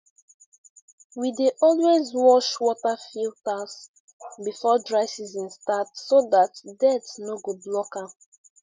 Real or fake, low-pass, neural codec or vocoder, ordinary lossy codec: real; none; none; none